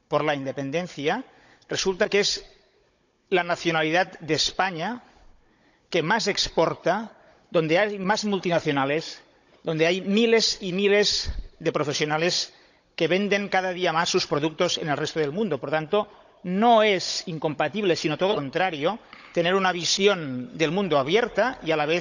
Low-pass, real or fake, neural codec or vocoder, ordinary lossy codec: 7.2 kHz; fake; codec, 16 kHz, 16 kbps, FunCodec, trained on Chinese and English, 50 frames a second; none